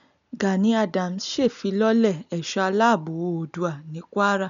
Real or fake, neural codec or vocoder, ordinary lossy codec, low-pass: real; none; none; 7.2 kHz